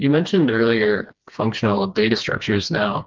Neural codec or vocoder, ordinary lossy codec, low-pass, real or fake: codec, 16 kHz, 2 kbps, FreqCodec, smaller model; Opus, 32 kbps; 7.2 kHz; fake